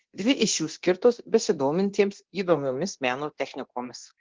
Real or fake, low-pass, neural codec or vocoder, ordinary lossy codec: fake; 7.2 kHz; codec, 24 kHz, 0.9 kbps, DualCodec; Opus, 16 kbps